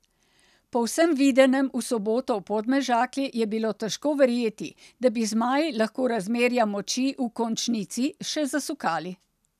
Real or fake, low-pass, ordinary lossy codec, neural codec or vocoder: real; 14.4 kHz; none; none